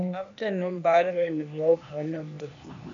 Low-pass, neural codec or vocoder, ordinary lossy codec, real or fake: 7.2 kHz; codec, 16 kHz, 0.8 kbps, ZipCodec; AAC, 64 kbps; fake